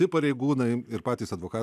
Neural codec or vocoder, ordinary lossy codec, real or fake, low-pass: none; AAC, 96 kbps; real; 14.4 kHz